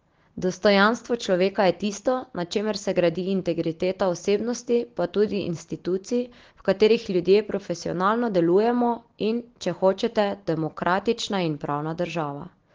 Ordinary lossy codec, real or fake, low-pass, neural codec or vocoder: Opus, 16 kbps; real; 7.2 kHz; none